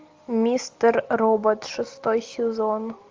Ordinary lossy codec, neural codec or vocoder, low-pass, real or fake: Opus, 32 kbps; none; 7.2 kHz; real